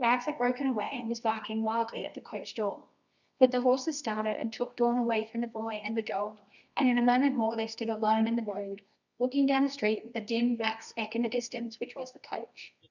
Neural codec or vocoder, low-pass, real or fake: codec, 24 kHz, 0.9 kbps, WavTokenizer, medium music audio release; 7.2 kHz; fake